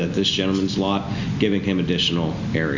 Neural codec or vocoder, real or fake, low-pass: none; real; 7.2 kHz